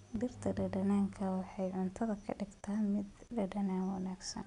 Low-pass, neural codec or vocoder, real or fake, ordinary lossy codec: 10.8 kHz; none; real; none